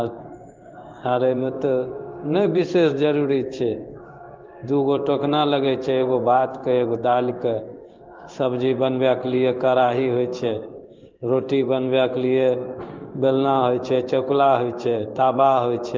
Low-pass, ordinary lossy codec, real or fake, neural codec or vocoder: 7.2 kHz; Opus, 24 kbps; fake; codec, 16 kHz in and 24 kHz out, 1 kbps, XY-Tokenizer